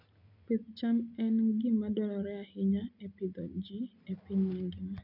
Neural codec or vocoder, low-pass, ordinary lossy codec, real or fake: none; 5.4 kHz; none; real